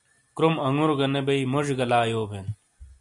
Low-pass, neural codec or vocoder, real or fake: 10.8 kHz; none; real